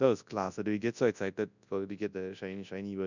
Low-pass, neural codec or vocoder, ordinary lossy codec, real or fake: 7.2 kHz; codec, 24 kHz, 0.9 kbps, WavTokenizer, large speech release; none; fake